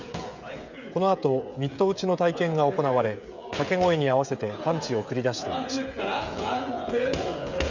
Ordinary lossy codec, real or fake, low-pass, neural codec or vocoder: none; fake; 7.2 kHz; codec, 16 kHz, 16 kbps, FreqCodec, smaller model